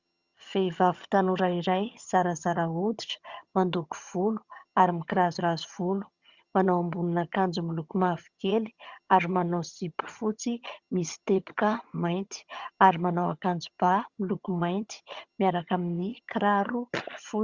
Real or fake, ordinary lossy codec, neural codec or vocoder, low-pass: fake; Opus, 64 kbps; vocoder, 22.05 kHz, 80 mel bands, HiFi-GAN; 7.2 kHz